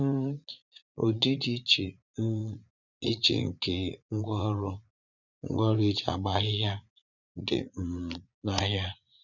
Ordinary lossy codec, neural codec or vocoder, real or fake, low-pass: none; vocoder, 44.1 kHz, 80 mel bands, Vocos; fake; 7.2 kHz